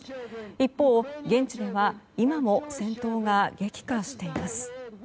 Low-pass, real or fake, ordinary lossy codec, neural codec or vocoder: none; real; none; none